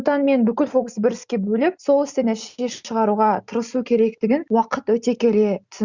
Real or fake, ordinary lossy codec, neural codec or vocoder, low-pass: real; Opus, 64 kbps; none; 7.2 kHz